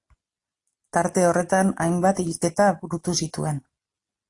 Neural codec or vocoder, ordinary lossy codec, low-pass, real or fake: none; AAC, 48 kbps; 10.8 kHz; real